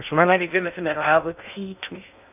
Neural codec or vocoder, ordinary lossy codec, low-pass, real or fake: codec, 16 kHz in and 24 kHz out, 0.6 kbps, FocalCodec, streaming, 2048 codes; none; 3.6 kHz; fake